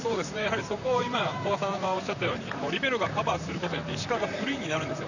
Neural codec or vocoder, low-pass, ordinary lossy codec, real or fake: vocoder, 44.1 kHz, 128 mel bands, Pupu-Vocoder; 7.2 kHz; none; fake